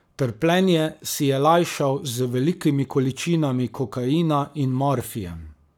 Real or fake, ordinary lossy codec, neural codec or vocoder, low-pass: fake; none; codec, 44.1 kHz, 7.8 kbps, Pupu-Codec; none